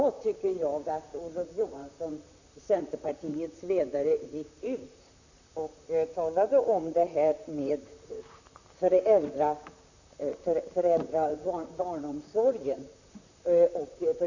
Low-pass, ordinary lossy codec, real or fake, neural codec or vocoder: 7.2 kHz; none; fake; vocoder, 44.1 kHz, 128 mel bands, Pupu-Vocoder